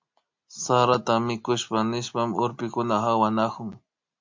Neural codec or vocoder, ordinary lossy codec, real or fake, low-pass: none; MP3, 64 kbps; real; 7.2 kHz